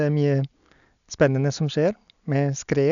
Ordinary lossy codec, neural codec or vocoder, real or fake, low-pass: none; none; real; 7.2 kHz